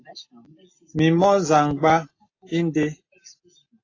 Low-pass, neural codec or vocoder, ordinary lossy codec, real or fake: 7.2 kHz; none; AAC, 32 kbps; real